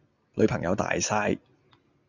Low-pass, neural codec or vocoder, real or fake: 7.2 kHz; none; real